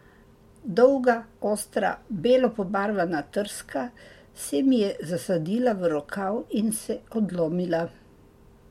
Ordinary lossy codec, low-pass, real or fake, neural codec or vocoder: MP3, 64 kbps; 19.8 kHz; real; none